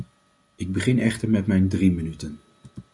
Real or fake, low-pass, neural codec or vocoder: real; 10.8 kHz; none